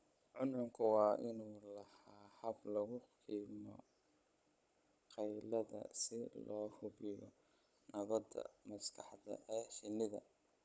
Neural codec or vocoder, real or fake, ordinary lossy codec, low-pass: codec, 16 kHz, 16 kbps, FunCodec, trained on Chinese and English, 50 frames a second; fake; none; none